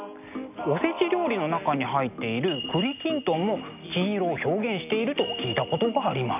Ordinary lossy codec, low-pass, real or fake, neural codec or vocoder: none; 3.6 kHz; real; none